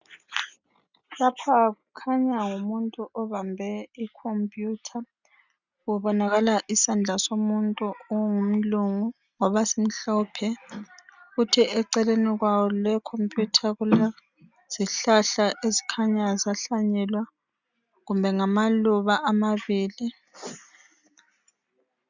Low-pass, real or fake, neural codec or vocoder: 7.2 kHz; real; none